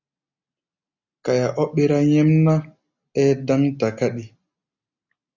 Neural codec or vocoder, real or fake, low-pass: none; real; 7.2 kHz